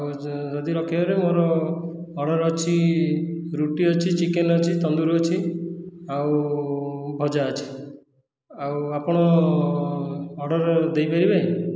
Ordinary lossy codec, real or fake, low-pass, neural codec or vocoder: none; real; none; none